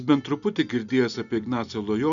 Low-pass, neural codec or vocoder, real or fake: 7.2 kHz; none; real